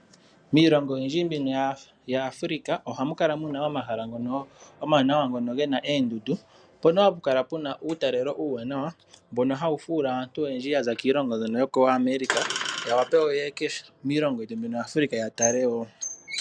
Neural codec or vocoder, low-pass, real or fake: none; 9.9 kHz; real